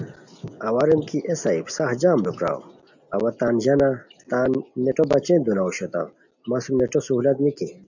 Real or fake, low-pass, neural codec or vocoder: real; 7.2 kHz; none